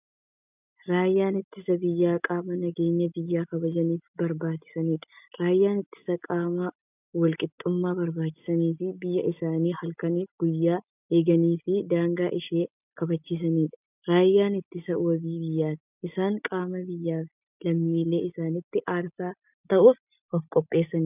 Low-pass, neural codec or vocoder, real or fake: 3.6 kHz; none; real